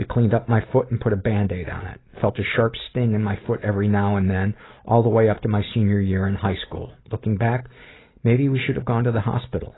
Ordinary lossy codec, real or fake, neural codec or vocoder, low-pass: AAC, 16 kbps; real; none; 7.2 kHz